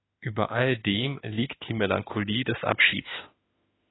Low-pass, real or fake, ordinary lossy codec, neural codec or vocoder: 7.2 kHz; fake; AAC, 16 kbps; autoencoder, 48 kHz, 32 numbers a frame, DAC-VAE, trained on Japanese speech